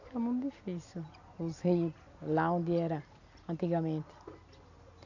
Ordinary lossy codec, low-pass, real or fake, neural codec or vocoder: none; 7.2 kHz; real; none